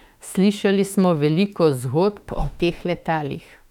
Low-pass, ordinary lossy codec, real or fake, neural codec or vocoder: 19.8 kHz; none; fake; autoencoder, 48 kHz, 32 numbers a frame, DAC-VAE, trained on Japanese speech